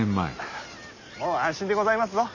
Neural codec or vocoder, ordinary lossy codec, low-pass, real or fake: none; none; 7.2 kHz; real